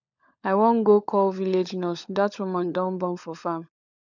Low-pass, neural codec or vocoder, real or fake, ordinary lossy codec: 7.2 kHz; codec, 16 kHz, 16 kbps, FunCodec, trained on LibriTTS, 50 frames a second; fake; none